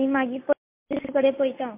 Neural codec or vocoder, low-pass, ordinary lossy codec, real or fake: none; 3.6 kHz; MP3, 24 kbps; real